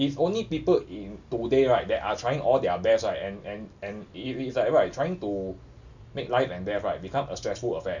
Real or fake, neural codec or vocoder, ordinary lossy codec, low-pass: real; none; none; 7.2 kHz